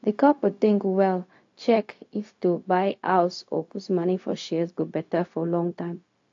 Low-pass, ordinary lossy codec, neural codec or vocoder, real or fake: 7.2 kHz; AAC, 48 kbps; codec, 16 kHz, 0.4 kbps, LongCat-Audio-Codec; fake